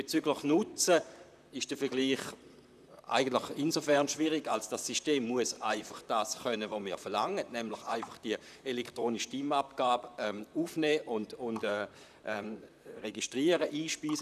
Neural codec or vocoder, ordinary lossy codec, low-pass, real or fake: vocoder, 44.1 kHz, 128 mel bands, Pupu-Vocoder; none; 14.4 kHz; fake